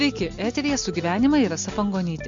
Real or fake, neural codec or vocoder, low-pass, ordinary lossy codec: real; none; 7.2 kHz; MP3, 48 kbps